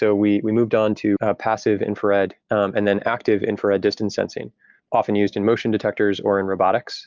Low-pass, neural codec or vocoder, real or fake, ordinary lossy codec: 7.2 kHz; none; real; Opus, 24 kbps